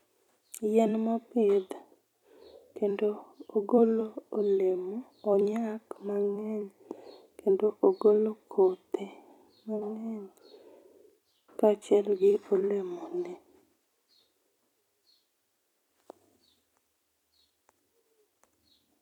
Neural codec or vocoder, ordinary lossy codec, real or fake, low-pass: vocoder, 44.1 kHz, 128 mel bands every 256 samples, BigVGAN v2; none; fake; 19.8 kHz